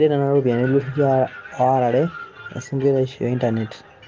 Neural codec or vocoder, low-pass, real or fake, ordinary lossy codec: none; 7.2 kHz; real; Opus, 32 kbps